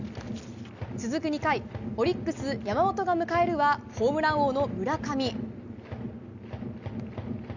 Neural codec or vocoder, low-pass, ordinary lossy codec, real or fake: none; 7.2 kHz; none; real